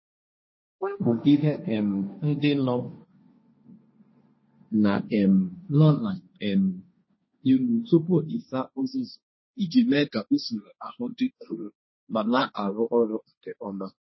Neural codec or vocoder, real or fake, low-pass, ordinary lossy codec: codec, 16 kHz, 1.1 kbps, Voila-Tokenizer; fake; 7.2 kHz; MP3, 24 kbps